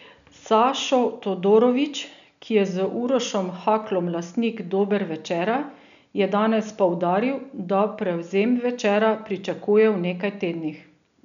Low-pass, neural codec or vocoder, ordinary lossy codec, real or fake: 7.2 kHz; none; none; real